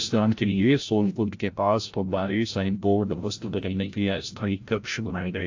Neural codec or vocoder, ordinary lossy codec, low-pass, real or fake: codec, 16 kHz, 0.5 kbps, FreqCodec, larger model; AAC, 48 kbps; 7.2 kHz; fake